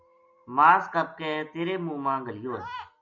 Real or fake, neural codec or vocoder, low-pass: real; none; 7.2 kHz